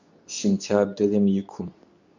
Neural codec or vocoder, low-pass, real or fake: codec, 24 kHz, 0.9 kbps, WavTokenizer, medium speech release version 2; 7.2 kHz; fake